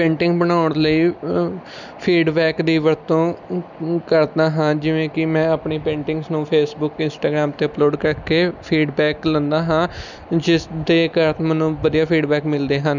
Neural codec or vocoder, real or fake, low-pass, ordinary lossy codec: none; real; 7.2 kHz; none